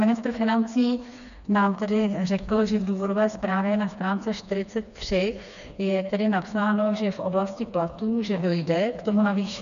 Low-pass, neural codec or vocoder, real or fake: 7.2 kHz; codec, 16 kHz, 2 kbps, FreqCodec, smaller model; fake